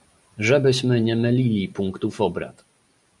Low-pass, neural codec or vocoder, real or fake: 10.8 kHz; none; real